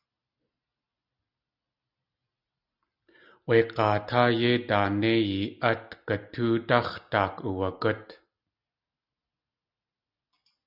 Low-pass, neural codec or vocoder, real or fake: 5.4 kHz; none; real